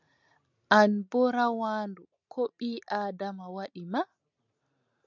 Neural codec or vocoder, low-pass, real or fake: none; 7.2 kHz; real